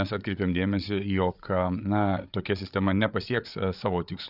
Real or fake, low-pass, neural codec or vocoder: fake; 5.4 kHz; codec, 16 kHz, 8 kbps, FreqCodec, larger model